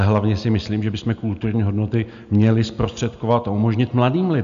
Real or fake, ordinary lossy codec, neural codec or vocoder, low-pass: real; MP3, 64 kbps; none; 7.2 kHz